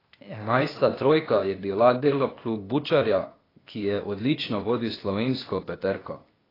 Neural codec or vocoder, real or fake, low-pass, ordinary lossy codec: codec, 16 kHz, 0.8 kbps, ZipCodec; fake; 5.4 kHz; AAC, 24 kbps